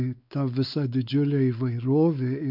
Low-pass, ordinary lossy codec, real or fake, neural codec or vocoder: 5.4 kHz; MP3, 48 kbps; fake; codec, 16 kHz, 4 kbps, X-Codec, WavLM features, trained on Multilingual LibriSpeech